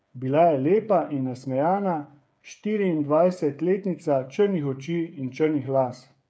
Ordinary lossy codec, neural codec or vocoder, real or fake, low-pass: none; codec, 16 kHz, 8 kbps, FreqCodec, smaller model; fake; none